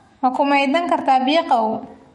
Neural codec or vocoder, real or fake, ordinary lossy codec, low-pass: autoencoder, 48 kHz, 128 numbers a frame, DAC-VAE, trained on Japanese speech; fake; MP3, 48 kbps; 19.8 kHz